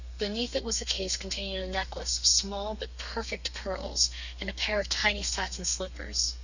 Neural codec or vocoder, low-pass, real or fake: codec, 32 kHz, 1.9 kbps, SNAC; 7.2 kHz; fake